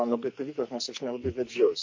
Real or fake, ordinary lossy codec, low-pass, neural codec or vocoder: fake; MP3, 48 kbps; 7.2 kHz; codec, 44.1 kHz, 2.6 kbps, SNAC